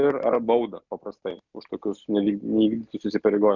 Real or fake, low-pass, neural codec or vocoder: real; 7.2 kHz; none